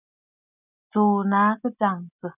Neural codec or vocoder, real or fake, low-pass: none; real; 3.6 kHz